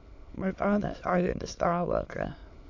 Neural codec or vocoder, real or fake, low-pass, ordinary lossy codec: autoencoder, 22.05 kHz, a latent of 192 numbers a frame, VITS, trained on many speakers; fake; 7.2 kHz; AAC, 48 kbps